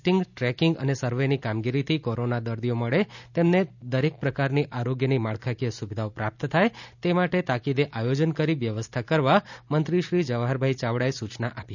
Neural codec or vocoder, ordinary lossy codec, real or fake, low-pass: none; none; real; 7.2 kHz